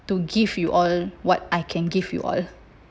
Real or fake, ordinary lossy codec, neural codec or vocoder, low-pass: real; none; none; none